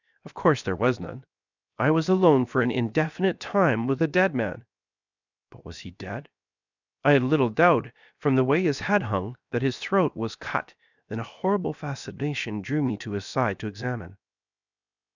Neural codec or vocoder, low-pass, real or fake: codec, 16 kHz, 0.7 kbps, FocalCodec; 7.2 kHz; fake